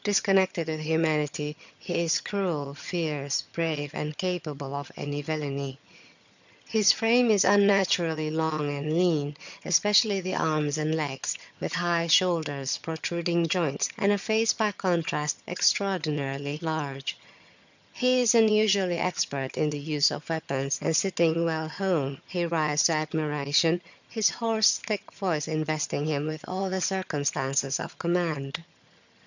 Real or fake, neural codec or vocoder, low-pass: fake; vocoder, 22.05 kHz, 80 mel bands, HiFi-GAN; 7.2 kHz